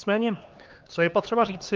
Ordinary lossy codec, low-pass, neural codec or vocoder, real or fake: Opus, 24 kbps; 7.2 kHz; codec, 16 kHz, 2 kbps, X-Codec, HuBERT features, trained on LibriSpeech; fake